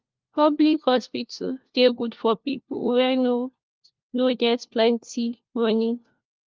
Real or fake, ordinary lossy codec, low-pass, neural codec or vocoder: fake; Opus, 32 kbps; 7.2 kHz; codec, 16 kHz, 1 kbps, FunCodec, trained on LibriTTS, 50 frames a second